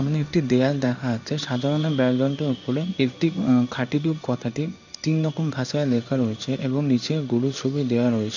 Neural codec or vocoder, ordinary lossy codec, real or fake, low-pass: codec, 16 kHz in and 24 kHz out, 1 kbps, XY-Tokenizer; none; fake; 7.2 kHz